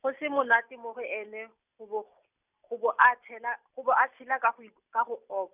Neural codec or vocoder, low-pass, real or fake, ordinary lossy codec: none; 3.6 kHz; real; none